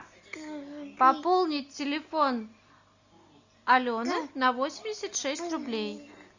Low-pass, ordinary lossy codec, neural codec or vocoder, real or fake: 7.2 kHz; Opus, 64 kbps; none; real